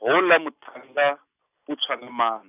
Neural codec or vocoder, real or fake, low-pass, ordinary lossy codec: none; real; 3.6 kHz; none